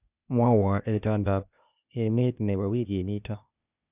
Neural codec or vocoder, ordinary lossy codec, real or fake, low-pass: codec, 16 kHz, 0.8 kbps, ZipCodec; none; fake; 3.6 kHz